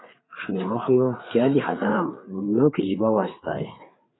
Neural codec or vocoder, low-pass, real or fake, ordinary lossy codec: codec, 16 kHz, 4 kbps, FreqCodec, larger model; 7.2 kHz; fake; AAC, 16 kbps